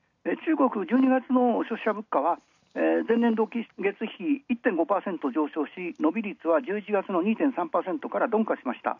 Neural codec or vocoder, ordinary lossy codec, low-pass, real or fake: none; none; 7.2 kHz; real